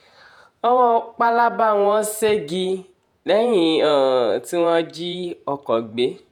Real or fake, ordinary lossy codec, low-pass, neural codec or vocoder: fake; none; 19.8 kHz; vocoder, 48 kHz, 128 mel bands, Vocos